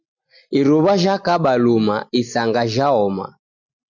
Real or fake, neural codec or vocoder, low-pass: real; none; 7.2 kHz